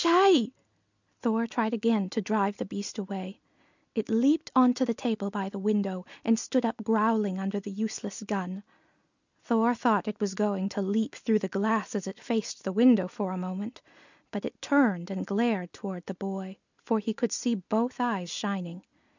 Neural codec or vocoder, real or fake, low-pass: none; real; 7.2 kHz